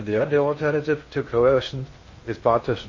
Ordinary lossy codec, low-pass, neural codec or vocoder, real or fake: MP3, 32 kbps; 7.2 kHz; codec, 16 kHz in and 24 kHz out, 0.6 kbps, FocalCodec, streaming, 2048 codes; fake